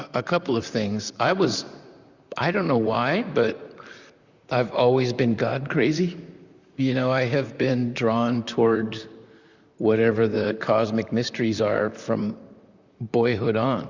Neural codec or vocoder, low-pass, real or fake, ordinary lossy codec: vocoder, 44.1 kHz, 128 mel bands, Pupu-Vocoder; 7.2 kHz; fake; Opus, 64 kbps